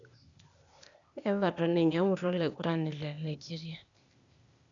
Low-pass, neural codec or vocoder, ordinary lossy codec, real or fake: 7.2 kHz; codec, 16 kHz, 0.8 kbps, ZipCodec; none; fake